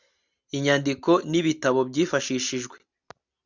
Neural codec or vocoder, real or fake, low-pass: none; real; 7.2 kHz